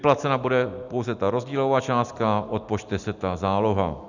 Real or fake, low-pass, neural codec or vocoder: real; 7.2 kHz; none